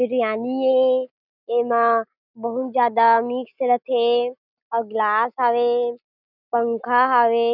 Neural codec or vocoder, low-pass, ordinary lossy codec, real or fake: none; 5.4 kHz; none; real